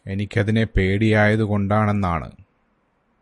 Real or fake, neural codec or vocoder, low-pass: real; none; 10.8 kHz